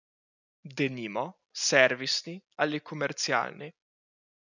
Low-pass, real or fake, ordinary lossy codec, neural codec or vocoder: 7.2 kHz; real; none; none